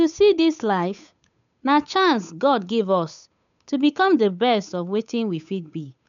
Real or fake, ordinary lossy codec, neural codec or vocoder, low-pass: fake; none; codec, 16 kHz, 8 kbps, FunCodec, trained on Chinese and English, 25 frames a second; 7.2 kHz